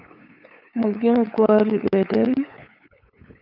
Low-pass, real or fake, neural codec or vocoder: 5.4 kHz; fake; codec, 16 kHz, 8 kbps, FunCodec, trained on LibriTTS, 25 frames a second